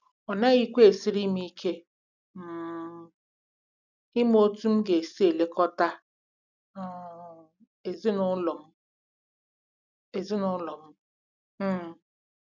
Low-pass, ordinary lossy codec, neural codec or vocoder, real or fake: 7.2 kHz; none; none; real